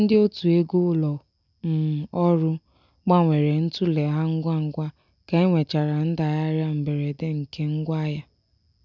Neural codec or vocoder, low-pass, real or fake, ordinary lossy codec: none; 7.2 kHz; real; none